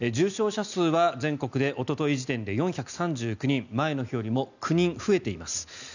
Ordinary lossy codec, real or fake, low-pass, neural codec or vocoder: none; real; 7.2 kHz; none